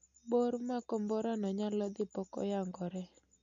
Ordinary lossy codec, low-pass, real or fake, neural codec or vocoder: MP3, 48 kbps; 7.2 kHz; real; none